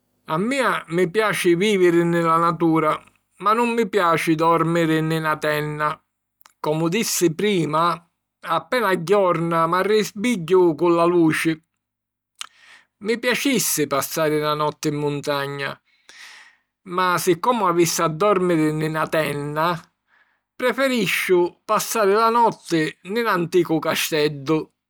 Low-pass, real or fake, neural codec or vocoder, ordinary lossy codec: none; real; none; none